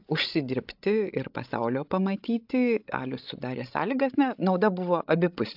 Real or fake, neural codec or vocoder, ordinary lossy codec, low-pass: fake; codec, 16 kHz, 16 kbps, FreqCodec, larger model; AAC, 48 kbps; 5.4 kHz